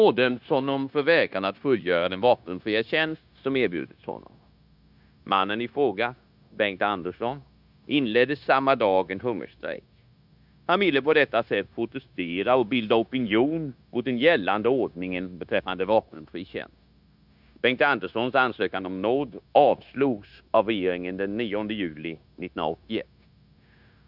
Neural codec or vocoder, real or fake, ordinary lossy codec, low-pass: codec, 16 kHz, 0.9 kbps, LongCat-Audio-Codec; fake; none; 5.4 kHz